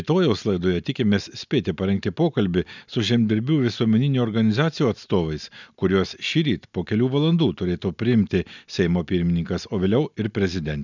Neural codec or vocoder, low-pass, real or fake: none; 7.2 kHz; real